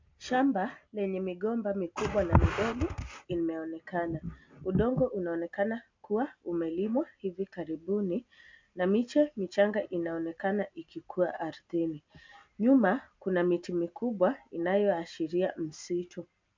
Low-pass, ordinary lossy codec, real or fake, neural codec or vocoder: 7.2 kHz; MP3, 64 kbps; real; none